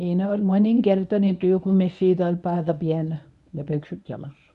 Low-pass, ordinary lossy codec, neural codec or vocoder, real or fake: 10.8 kHz; none; codec, 24 kHz, 0.9 kbps, WavTokenizer, medium speech release version 1; fake